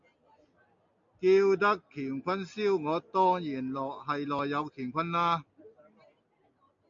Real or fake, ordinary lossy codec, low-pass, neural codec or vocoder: real; MP3, 48 kbps; 7.2 kHz; none